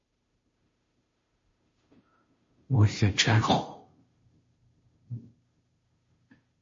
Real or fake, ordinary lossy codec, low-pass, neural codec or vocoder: fake; MP3, 32 kbps; 7.2 kHz; codec, 16 kHz, 0.5 kbps, FunCodec, trained on Chinese and English, 25 frames a second